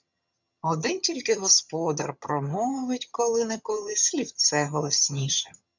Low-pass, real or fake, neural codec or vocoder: 7.2 kHz; fake; vocoder, 22.05 kHz, 80 mel bands, HiFi-GAN